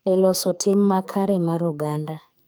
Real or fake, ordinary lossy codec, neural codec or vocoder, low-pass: fake; none; codec, 44.1 kHz, 2.6 kbps, SNAC; none